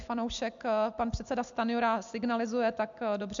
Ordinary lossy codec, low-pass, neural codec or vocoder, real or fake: MP3, 64 kbps; 7.2 kHz; none; real